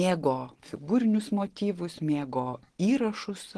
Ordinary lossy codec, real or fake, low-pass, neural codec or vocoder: Opus, 16 kbps; real; 10.8 kHz; none